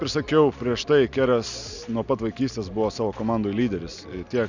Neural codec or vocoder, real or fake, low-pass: none; real; 7.2 kHz